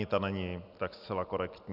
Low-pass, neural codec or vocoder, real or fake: 5.4 kHz; none; real